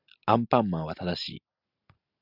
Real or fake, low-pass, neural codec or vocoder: real; 5.4 kHz; none